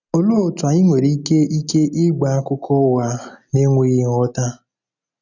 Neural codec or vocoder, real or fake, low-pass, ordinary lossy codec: none; real; 7.2 kHz; none